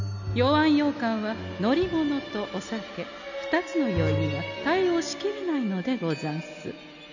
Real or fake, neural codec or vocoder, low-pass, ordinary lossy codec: real; none; 7.2 kHz; none